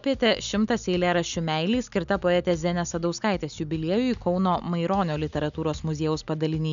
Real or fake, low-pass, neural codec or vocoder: real; 7.2 kHz; none